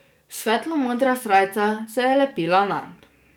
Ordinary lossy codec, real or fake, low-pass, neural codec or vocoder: none; fake; none; codec, 44.1 kHz, 7.8 kbps, DAC